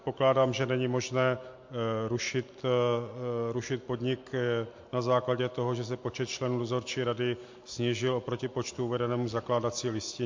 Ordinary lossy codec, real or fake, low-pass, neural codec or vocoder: MP3, 48 kbps; real; 7.2 kHz; none